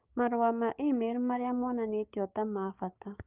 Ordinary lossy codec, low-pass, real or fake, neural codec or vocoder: Opus, 24 kbps; 3.6 kHz; fake; codec, 16 kHz, 6 kbps, DAC